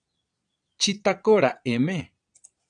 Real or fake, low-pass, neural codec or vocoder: fake; 9.9 kHz; vocoder, 22.05 kHz, 80 mel bands, Vocos